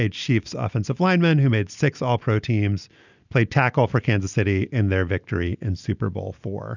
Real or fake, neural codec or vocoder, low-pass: real; none; 7.2 kHz